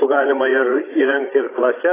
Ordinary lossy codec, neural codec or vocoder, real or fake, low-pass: AAC, 16 kbps; codec, 16 kHz, 16 kbps, FreqCodec, larger model; fake; 3.6 kHz